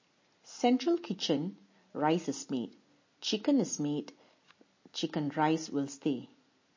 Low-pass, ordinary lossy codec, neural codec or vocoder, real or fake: 7.2 kHz; MP3, 32 kbps; none; real